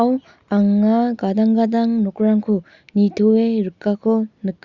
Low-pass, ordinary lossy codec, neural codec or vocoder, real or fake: 7.2 kHz; Opus, 64 kbps; none; real